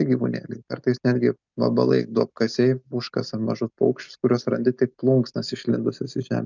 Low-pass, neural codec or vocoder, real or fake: 7.2 kHz; none; real